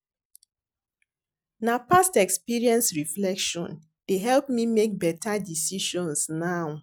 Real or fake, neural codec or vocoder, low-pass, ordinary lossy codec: real; none; none; none